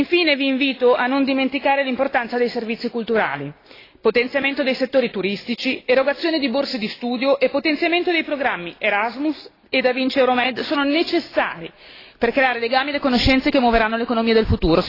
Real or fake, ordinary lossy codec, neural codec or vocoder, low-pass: real; AAC, 24 kbps; none; 5.4 kHz